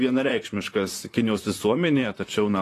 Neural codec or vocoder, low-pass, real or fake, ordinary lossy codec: vocoder, 44.1 kHz, 128 mel bands, Pupu-Vocoder; 14.4 kHz; fake; AAC, 48 kbps